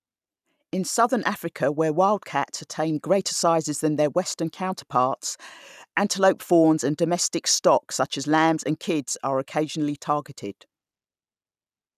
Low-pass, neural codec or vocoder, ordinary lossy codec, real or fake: 14.4 kHz; none; none; real